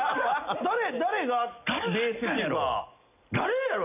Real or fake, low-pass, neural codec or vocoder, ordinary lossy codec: real; 3.6 kHz; none; none